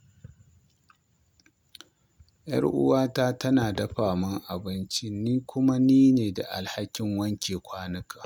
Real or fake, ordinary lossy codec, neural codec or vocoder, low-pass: real; none; none; 19.8 kHz